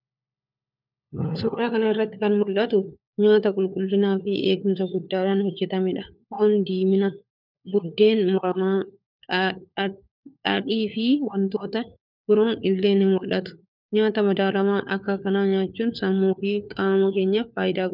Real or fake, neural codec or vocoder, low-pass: fake; codec, 16 kHz, 4 kbps, FunCodec, trained on LibriTTS, 50 frames a second; 5.4 kHz